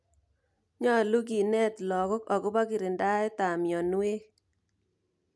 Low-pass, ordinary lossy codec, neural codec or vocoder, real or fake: none; none; none; real